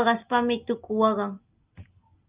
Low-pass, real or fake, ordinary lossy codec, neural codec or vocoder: 3.6 kHz; real; Opus, 24 kbps; none